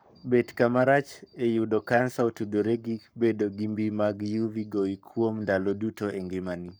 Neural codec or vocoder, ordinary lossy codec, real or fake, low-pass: codec, 44.1 kHz, 7.8 kbps, Pupu-Codec; none; fake; none